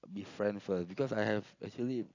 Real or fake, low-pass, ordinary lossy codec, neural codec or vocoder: real; 7.2 kHz; AAC, 48 kbps; none